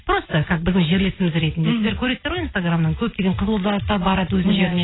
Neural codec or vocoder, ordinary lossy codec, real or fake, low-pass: none; AAC, 16 kbps; real; 7.2 kHz